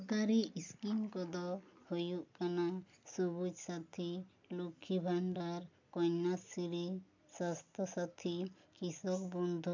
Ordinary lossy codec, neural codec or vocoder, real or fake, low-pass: MP3, 64 kbps; none; real; 7.2 kHz